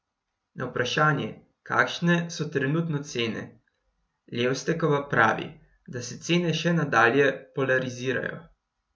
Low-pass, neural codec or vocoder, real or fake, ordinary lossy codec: none; none; real; none